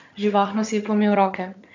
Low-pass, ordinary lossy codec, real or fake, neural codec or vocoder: 7.2 kHz; none; fake; vocoder, 22.05 kHz, 80 mel bands, HiFi-GAN